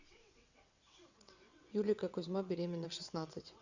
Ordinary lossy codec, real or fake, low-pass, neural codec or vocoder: Opus, 64 kbps; fake; 7.2 kHz; vocoder, 22.05 kHz, 80 mel bands, WaveNeXt